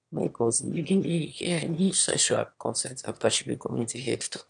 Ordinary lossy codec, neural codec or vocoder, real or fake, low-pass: none; autoencoder, 22.05 kHz, a latent of 192 numbers a frame, VITS, trained on one speaker; fake; 9.9 kHz